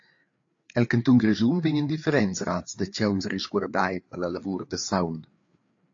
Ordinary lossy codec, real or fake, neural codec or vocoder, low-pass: AAC, 48 kbps; fake; codec, 16 kHz, 4 kbps, FreqCodec, larger model; 7.2 kHz